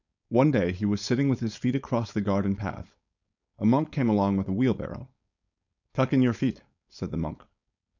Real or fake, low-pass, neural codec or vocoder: fake; 7.2 kHz; codec, 16 kHz, 4.8 kbps, FACodec